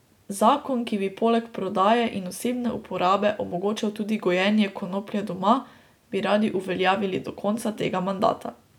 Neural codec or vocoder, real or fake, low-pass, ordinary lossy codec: none; real; 19.8 kHz; none